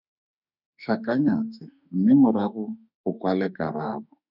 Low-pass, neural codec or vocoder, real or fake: 5.4 kHz; autoencoder, 48 kHz, 32 numbers a frame, DAC-VAE, trained on Japanese speech; fake